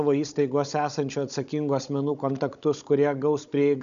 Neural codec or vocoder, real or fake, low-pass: codec, 16 kHz, 16 kbps, FunCodec, trained on Chinese and English, 50 frames a second; fake; 7.2 kHz